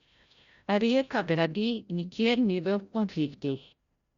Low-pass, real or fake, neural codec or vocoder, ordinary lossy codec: 7.2 kHz; fake; codec, 16 kHz, 0.5 kbps, FreqCodec, larger model; Opus, 64 kbps